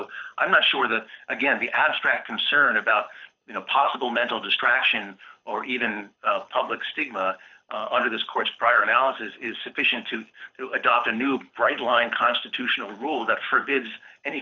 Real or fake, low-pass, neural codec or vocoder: fake; 7.2 kHz; codec, 24 kHz, 6 kbps, HILCodec